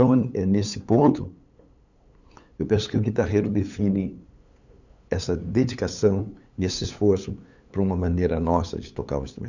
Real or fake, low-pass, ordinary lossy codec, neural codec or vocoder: fake; 7.2 kHz; none; codec, 16 kHz, 8 kbps, FunCodec, trained on LibriTTS, 25 frames a second